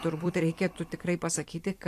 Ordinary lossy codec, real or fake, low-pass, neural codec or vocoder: AAC, 64 kbps; fake; 14.4 kHz; vocoder, 44.1 kHz, 128 mel bands every 256 samples, BigVGAN v2